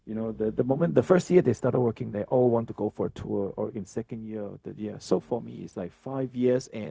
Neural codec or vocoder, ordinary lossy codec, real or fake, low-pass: codec, 16 kHz, 0.4 kbps, LongCat-Audio-Codec; none; fake; none